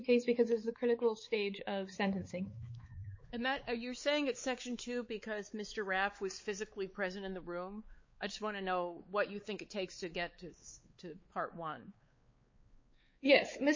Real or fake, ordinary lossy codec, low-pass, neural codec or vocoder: fake; MP3, 32 kbps; 7.2 kHz; codec, 16 kHz, 4 kbps, X-Codec, WavLM features, trained on Multilingual LibriSpeech